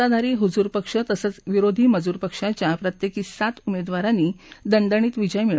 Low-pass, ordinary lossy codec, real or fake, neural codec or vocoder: none; none; real; none